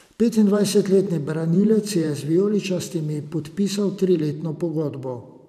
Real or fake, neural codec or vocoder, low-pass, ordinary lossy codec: fake; vocoder, 44.1 kHz, 128 mel bands every 512 samples, BigVGAN v2; 14.4 kHz; none